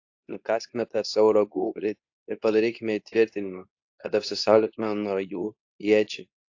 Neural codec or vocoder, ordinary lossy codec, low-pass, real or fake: codec, 24 kHz, 0.9 kbps, WavTokenizer, medium speech release version 2; AAC, 48 kbps; 7.2 kHz; fake